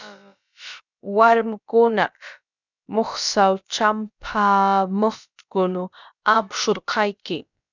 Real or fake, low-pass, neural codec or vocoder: fake; 7.2 kHz; codec, 16 kHz, about 1 kbps, DyCAST, with the encoder's durations